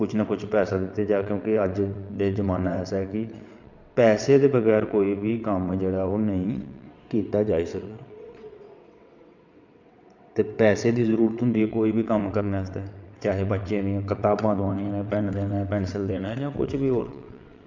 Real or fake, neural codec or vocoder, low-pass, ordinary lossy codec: fake; vocoder, 22.05 kHz, 80 mel bands, WaveNeXt; 7.2 kHz; none